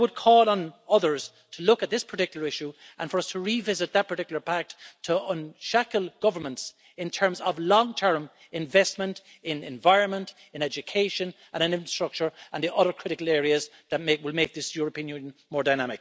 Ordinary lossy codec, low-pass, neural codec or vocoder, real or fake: none; none; none; real